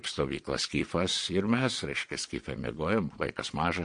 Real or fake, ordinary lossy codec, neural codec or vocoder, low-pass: fake; MP3, 48 kbps; vocoder, 22.05 kHz, 80 mel bands, Vocos; 9.9 kHz